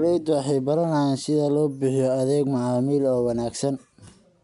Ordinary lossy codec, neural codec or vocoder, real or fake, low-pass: MP3, 96 kbps; none; real; 10.8 kHz